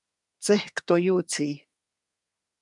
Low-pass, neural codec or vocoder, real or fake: 10.8 kHz; codec, 24 kHz, 1 kbps, SNAC; fake